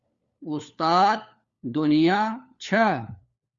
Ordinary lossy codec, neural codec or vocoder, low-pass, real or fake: Opus, 64 kbps; codec, 16 kHz, 4 kbps, FunCodec, trained on LibriTTS, 50 frames a second; 7.2 kHz; fake